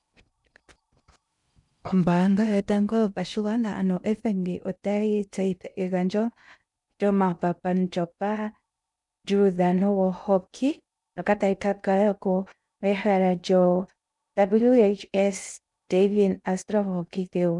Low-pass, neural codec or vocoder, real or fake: 10.8 kHz; codec, 16 kHz in and 24 kHz out, 0.6 kbps, FocalCodec, streaming, 2048 codes; fake